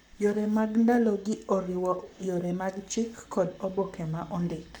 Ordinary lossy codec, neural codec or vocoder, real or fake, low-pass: MP3, 96 kbps; codec, 44.1 kHz, 7.8 kbps, Pupu-Codec; fake; 19.8 kHz